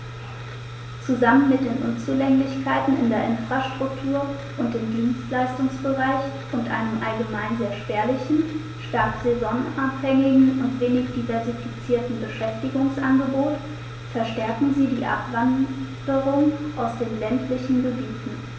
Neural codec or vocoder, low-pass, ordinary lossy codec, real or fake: none; none; none; real